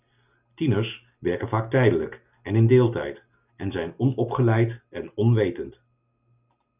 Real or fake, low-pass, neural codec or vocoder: real; 3.6 kHz; none